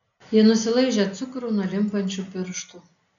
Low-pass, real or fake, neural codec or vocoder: 7.2 kHz; real; none